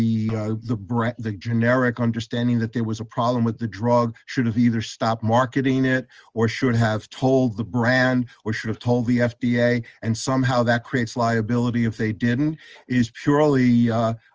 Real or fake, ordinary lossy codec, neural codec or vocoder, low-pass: real; Opus, 24 kbps; none; 7.2 kHz